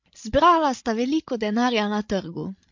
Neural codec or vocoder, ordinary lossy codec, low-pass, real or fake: codec, 16 kHz, 16 kbps, FreqCodec, larger model; MP3, 48 kbps; 7.2 kHz; fake